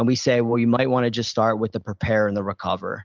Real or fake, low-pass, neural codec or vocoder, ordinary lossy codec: real; 7.2 kHz; none; Opus, 24 kbps